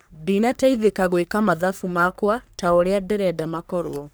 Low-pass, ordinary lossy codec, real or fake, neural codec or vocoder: none; none; fake; codec, 44.1 kHz, 3.4 kbps, Pupu-Codec